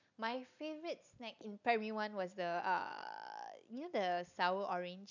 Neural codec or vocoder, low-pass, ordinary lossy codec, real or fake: none; 7.2 kHz; none; real